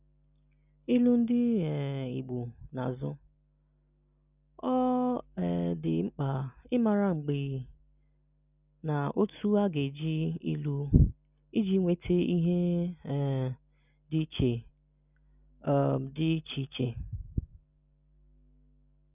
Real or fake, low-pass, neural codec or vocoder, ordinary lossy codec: real; 3.6 kHz; none; none